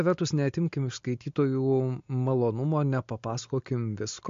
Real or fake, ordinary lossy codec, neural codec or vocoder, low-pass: real; MP3, 48 kbps; none; 7.2 kHz